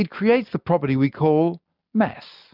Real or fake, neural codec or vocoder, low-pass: real; none; 5.4 kHz